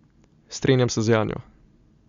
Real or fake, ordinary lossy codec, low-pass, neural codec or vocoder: real; Opus, 64 kbps; 7.2 kHz; none